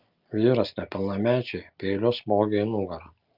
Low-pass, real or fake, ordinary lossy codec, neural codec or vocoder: 5.4 kHz; real; Opus, 24 kbps; none